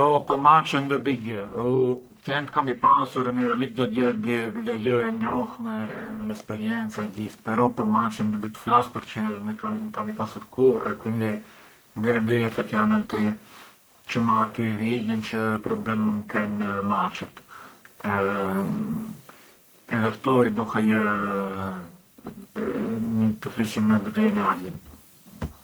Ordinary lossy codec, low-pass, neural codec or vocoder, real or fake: none; none; codec, 44.1 kHz, 1.7 kbps, Pupu-Codec; fake